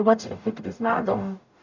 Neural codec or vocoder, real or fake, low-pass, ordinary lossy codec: codec, 44.1 kHz, 0.9 kbps, DAC; fake; 7.2 kHz; none